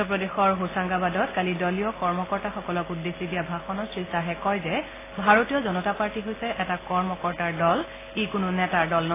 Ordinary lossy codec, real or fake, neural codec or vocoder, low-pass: AAC, 16 kbps; real; none; 3.6 kHz